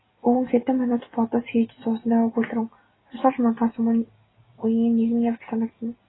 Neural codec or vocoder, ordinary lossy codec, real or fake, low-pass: none; AAC, 16 kbps; real; 7.2 kHz